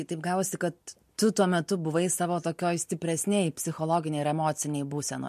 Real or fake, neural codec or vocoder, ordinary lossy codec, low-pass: real; none; MP3, 64 kbps; 14.4 kHz